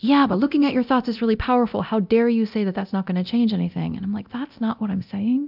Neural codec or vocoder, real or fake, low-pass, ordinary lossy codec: codec, 24 kHz, 0.9 kbps, DualCodec; fake; 5.4 kHz; AAC, 48 kbps